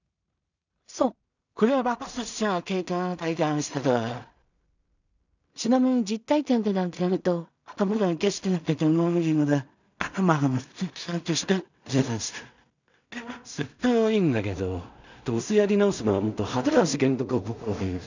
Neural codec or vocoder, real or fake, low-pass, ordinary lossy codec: codec, 16 kHz in and 24 kHz out, 0.4 kbps, LongCat-Audio-Codec, two codebook decoder; fake; 7.2 kHz; none